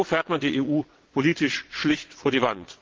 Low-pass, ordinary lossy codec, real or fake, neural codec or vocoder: 7.2 kHz; Opus, 16 kbps; fake; vocoder, 22.05 kHz, 80 mel bands, WaveNeXt